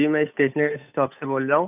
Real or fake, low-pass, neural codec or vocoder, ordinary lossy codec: fake; 3.6 kHz; codec, 16 kHz, 8 kbps, FunCodec, trained on Chinese and English, 25 frames a second; none